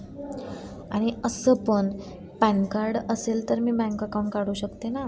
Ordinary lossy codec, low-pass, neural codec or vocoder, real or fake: none; none; none; real